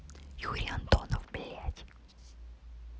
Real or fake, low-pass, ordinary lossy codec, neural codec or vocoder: real; none; none; none